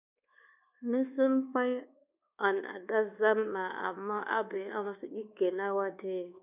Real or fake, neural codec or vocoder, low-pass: fake; codec, 24 kHz, 1.2 kbps, DualCodec; 3.6 kHz